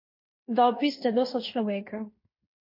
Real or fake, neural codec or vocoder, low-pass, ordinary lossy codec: fake; codec, 16 kHz in and 24 kHz out, 0.9 kbps, LongCat-Audio-Codec, fine tuned four codebook decoder; 5.4 kHz; MP3, 32 kbps